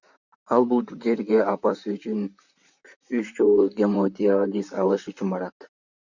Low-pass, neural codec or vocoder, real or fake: 7.2 kHz; vocoder, 44.1 kHz, 128 mel bands, Pupu-Vocoder; fake